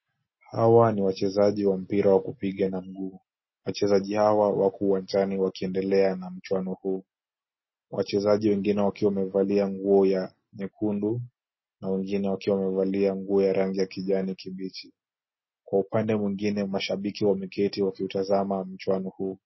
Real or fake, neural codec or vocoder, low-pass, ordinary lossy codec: real; none; 7.2 kHz; MP3, 24 kbps